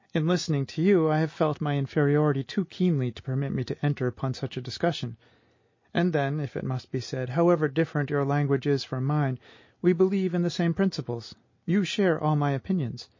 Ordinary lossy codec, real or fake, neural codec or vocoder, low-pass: MP3, 32 kbps; real; none; 7.2 kHz